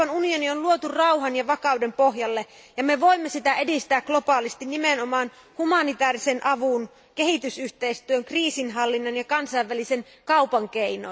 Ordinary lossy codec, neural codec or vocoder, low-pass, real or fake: none; none; none; real